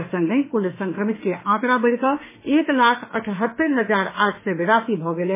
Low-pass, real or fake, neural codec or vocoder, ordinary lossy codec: 3.6 kHz; fake; autoencoder, 48 kHz, 32 numbers a frame, DAC-VAE, trained on Japanese speech; MP3, 16 kbps